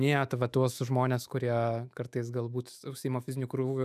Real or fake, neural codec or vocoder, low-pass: fake; autoencoder, 48 kHz, 128 numbers a frame, DAC-VAE, trained on Japanese speech; 14.4 kHz